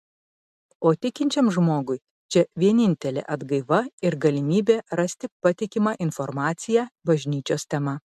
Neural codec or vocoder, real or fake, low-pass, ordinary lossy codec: none; real; 14.4 kHz; MP3, 96 kbps